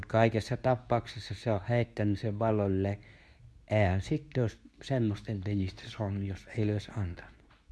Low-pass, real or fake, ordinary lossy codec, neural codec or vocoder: none; fake; none; codec, 24 kHz, 0.9 kbps, WavTokenizer, medium speech release version 2